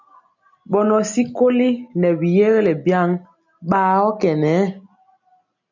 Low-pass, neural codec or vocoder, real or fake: 7.2 kHz; none; real